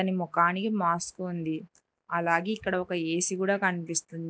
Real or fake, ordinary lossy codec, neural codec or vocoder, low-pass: real; none; none; none